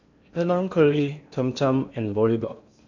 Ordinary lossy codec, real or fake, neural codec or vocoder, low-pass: none; fake; codec, 16 kHz in and 24 kHz out, 0.8 kbps, FocalCodec, streaming, 65536 codes; 7.2 kHz